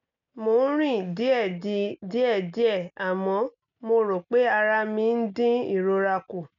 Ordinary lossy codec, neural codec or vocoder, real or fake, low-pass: none; none; real; 7.2 kHz